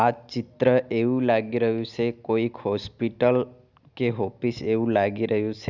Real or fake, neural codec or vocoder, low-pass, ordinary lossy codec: real; none; 7.2 kHz; none